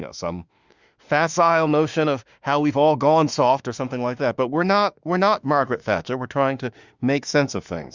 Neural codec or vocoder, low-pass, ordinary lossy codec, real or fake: autoencoder, 48 kHz, 32 numbers a frame, DAC-VAE, trained on Japanese speech; 7.2 kHz; Opus, 64 kbps; fake